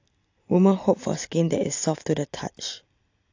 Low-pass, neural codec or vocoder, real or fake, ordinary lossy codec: 7.2 kHz; none; real; AAC, 48 kbps